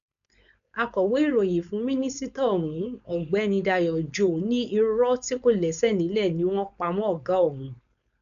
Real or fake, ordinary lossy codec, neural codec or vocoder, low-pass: fake; none; codec, 16 kHz, 4.8 kbps, FACodec; 7.2 kHz